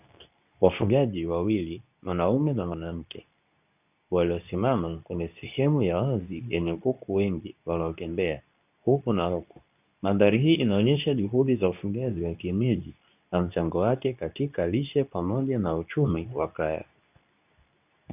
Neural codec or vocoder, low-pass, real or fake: codec, 24 kHz, 0.9 kbps, WavTokenizer, medium speech release version 2; 3.6 kHz; fake